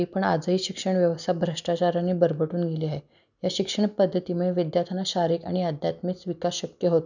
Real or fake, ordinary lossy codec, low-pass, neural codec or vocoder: real; none; 7.2 kHz; none